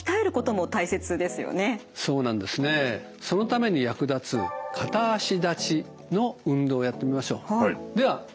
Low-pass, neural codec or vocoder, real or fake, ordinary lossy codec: none; none; real; none